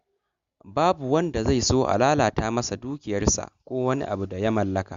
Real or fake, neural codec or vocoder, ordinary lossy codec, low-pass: real; none; Opus, 64 kbps; 7.2 kHz